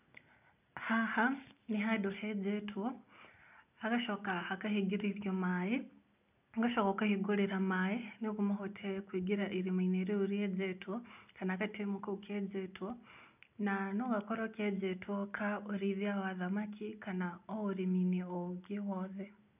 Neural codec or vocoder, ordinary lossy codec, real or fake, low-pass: none; none; real; 3.6 kHz